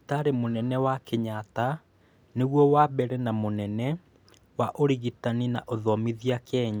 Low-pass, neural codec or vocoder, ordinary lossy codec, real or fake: none; none; none; real